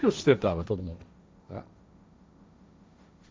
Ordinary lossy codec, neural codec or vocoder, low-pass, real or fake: none; codec, 16 kHz, 1.1 kbps, Voila-Tokenizer; none; fake